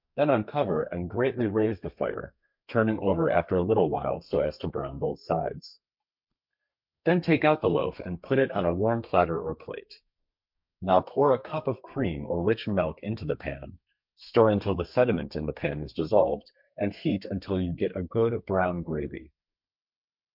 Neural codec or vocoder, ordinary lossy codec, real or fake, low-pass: codec, 32 kHz, 1.9 kbps, SNAC; MP3, 48 kbps; fake; 5.4 kHz